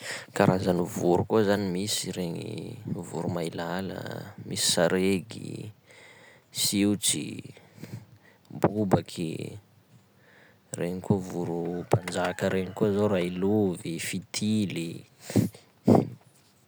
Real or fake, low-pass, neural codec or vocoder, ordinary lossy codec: real; none; none; none